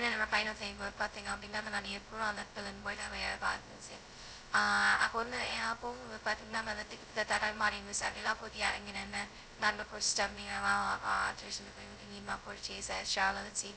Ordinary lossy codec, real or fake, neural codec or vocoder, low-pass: none; fake; codec, 16 kHz, 0.2 kbps, FocalCodec; none